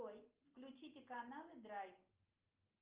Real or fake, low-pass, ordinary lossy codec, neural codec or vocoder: real; 3.6 kHz; Opus, 24 kbps; none